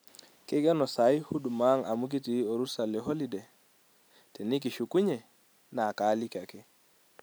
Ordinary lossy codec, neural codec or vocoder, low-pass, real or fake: none; none; none; real